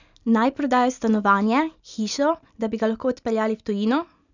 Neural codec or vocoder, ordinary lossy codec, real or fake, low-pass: none; none; real; 7.2 kHz